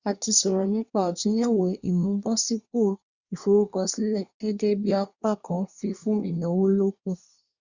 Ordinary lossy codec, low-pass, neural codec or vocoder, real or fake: Opus, 64 kbps; 7.2 kHz; codec, 24 kHz, 1 kbps, SNAC; fake